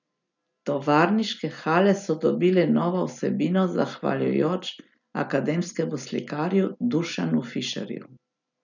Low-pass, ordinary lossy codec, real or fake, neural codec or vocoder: 7.2 kHz; none; real; none